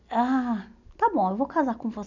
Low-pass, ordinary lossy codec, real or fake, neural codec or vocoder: 7.2 kHz; none; real; none